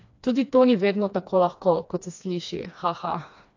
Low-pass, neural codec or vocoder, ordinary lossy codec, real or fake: 7.2 kHz; codec, 16 kHz, 2 kbps, FreqCodec, smaller model; AAC, 48 kbps; fake